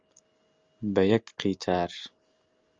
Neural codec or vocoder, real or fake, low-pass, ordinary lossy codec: none; real; 7.2 kHz; Opus, 32 kbps